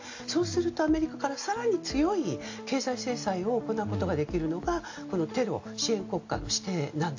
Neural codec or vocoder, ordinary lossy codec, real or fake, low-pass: none; AAC, 48 kbps; real; 7.2 kHz